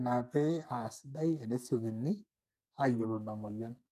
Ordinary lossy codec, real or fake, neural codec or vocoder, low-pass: none; fake; codec, 44.1 kHz, 2.6 kbps, SNAC; 14.4 kHz